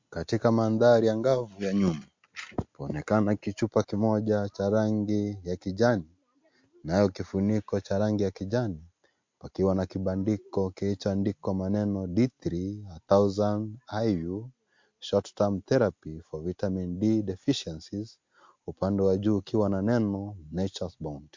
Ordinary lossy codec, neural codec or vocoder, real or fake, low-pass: MP3, 48 kbps; none; real; 7.2 kHz